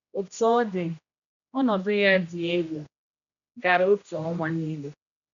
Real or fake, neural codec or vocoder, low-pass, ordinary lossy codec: fake; codec, 16 kHz, 1 kbps, X-Codec, HuBERT features, trained on general audio; 7.2 kHz; none